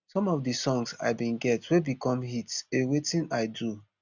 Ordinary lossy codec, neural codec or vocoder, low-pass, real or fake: none; none; 7.2 kHz; real